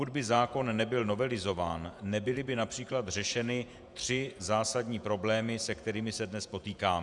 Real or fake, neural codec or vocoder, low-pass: fake; vocoder, 48 kHz, 128 mel bands, Vocos; 10.8 kHz